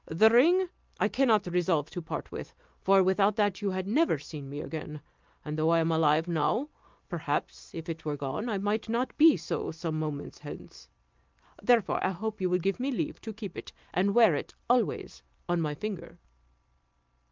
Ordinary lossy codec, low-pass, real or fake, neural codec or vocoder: Opus, 24 kbps; 7.2 kHz; real; none